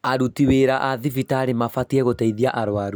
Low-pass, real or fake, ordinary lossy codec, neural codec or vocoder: none; real; none; none